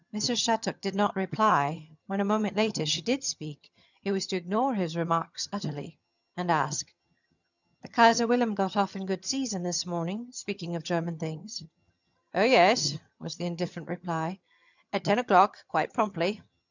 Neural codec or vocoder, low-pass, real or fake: vocoder, 22.05 kHz, 80 mel bands, HiFi-GAN; 7.2 kHz; fake